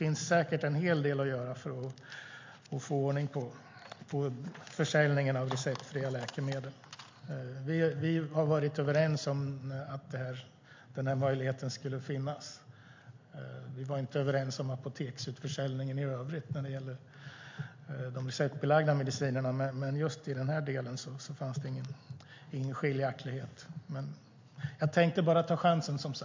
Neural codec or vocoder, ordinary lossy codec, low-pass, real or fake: none; MP3, 48 kbps; 7.2 kHz; real